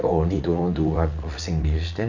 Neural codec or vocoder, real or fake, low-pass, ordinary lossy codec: vocoder, 44.1 kHz, 80 mel bands, Vocos; fake; 7.2 kHz; none